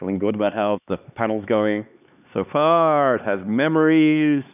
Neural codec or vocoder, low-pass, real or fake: codec, 16 kHz, 2 kbps, X-Codec, HuBERT features, trained on LibriSpeech; 3.6 kHz; fake